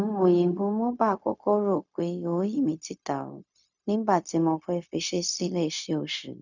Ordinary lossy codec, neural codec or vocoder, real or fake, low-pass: none; codec, 16 kHz, 0.4 kbps, LongCat-Audio-Codec; fake; 7.2 kHz